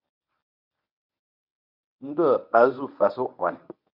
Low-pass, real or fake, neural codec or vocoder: 5.4 kHz; fake; codec, 24 kHz, 0.9 kbps, WavTokenizer, medium speech release version 1